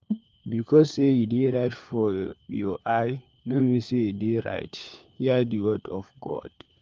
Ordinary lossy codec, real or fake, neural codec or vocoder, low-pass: Opus, 24 kbps; fake; codec, 16 kHz, 4 kbps, FunCodec, trained on LibriTTS, 50 frames a second; 7.2 kHz